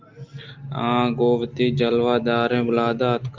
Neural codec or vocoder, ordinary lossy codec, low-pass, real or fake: none; Opus, 24 kbps; 7.2 kHz; real